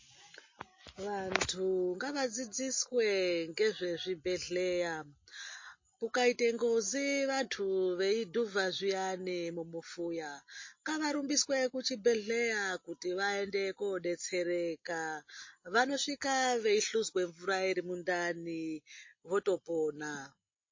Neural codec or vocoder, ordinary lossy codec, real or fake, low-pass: none; MP3, 32 kbps; real; 7.2 kHz